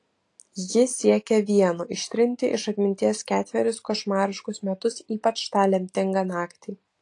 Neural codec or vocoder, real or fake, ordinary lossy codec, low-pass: none; real; AAC, 48 kbps; 10.8 kHz